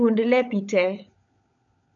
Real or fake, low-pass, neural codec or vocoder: fake; 7.2 kHz; codec, 16 kHz, 16 kbps, FunCodec, trained on LibriTTS, 50 frames a second